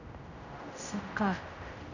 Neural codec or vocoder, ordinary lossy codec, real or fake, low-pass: codec, 16 kHz, 0.5 kbps, X-Codec, HuBERT features, trained on general audio; none; fake; 7.2 kHz